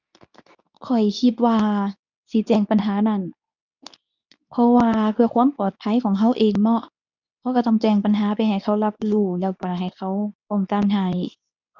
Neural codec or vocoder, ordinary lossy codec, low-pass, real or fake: codec, 24 kHz, 0.9 kbps, WavTokenizer, medium speech release version 2; none; 7.2 kHz; fake